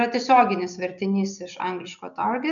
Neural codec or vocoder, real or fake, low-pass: none; real; 7.2 kHz